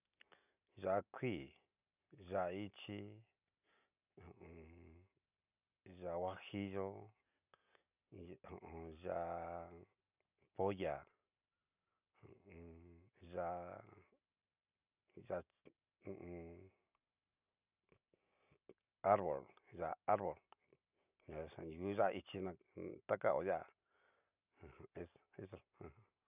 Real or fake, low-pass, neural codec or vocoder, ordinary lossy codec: real; 3.6 kHz; none; none